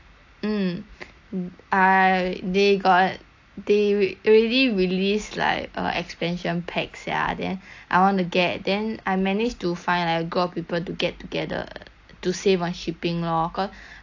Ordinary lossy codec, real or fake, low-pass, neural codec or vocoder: AAC, 48 kbps; real; 7.2 kHz; none